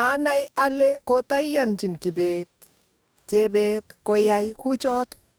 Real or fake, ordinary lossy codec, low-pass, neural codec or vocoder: fake; none; none; codec, 44.1 kHz, 2.6 kbps, DAC